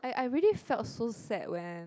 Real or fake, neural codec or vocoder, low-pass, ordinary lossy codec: real; none; none; none